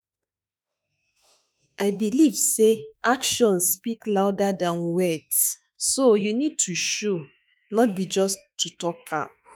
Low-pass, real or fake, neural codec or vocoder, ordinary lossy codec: none; fake; autoencoder, 48 kHz, 32 numbers a frame, DAC-VAE, trained on Japanese speech; none